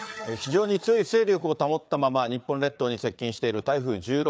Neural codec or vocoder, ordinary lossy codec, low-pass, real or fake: codec, 16 kHz, 4 kbps, FreqCodec, larger model; none; none; fake